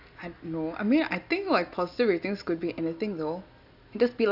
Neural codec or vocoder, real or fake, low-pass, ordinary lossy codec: none; real; 5.4 kHz; none